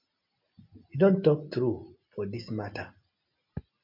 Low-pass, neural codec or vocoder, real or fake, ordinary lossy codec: 5.4 kHz; none; real; MP3, 32 kbps